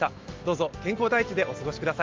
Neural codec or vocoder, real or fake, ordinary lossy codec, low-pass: none; real; Opus, 24 kbps; 7.2 kHz